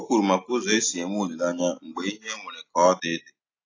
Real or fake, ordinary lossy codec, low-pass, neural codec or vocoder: real; AAC, 32 kbps; 7.2 kHz; none